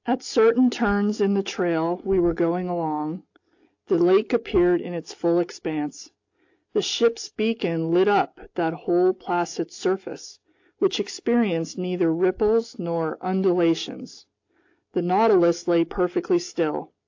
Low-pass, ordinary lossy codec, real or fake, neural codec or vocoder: 7.2 kHz; AAC, 48 kbps; real; none